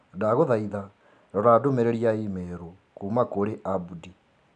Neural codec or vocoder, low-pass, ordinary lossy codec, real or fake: none; 9.9 kHz; none; real